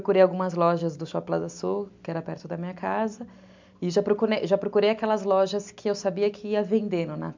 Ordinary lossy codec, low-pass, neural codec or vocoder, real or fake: MP3, 64 kbps; 7.2 kHz; none; real